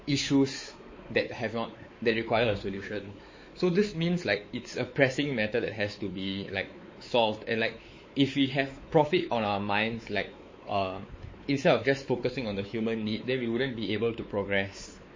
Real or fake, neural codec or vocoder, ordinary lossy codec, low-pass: fake; codec, 16 kHz, 4 kbps, X-Codec, WavLM features, trained on Multilingual LibriSpeech; MP3, 32 kbps; 7.2 kHz